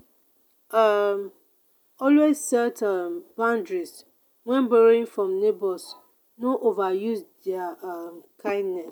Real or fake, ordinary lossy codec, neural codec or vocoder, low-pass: real; none; none; none